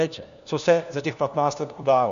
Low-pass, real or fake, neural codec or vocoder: 7.2 kHz; fake; codec, 16 kHz, 1 kbps, FunCodec, trained on LibriTTS, 50 frames a second